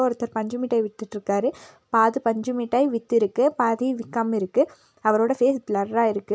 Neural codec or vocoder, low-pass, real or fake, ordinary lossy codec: none; none; real; none